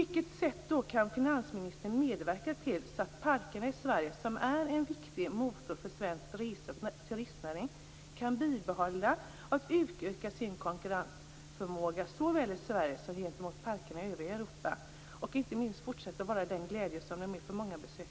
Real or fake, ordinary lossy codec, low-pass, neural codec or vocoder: real; none; none; none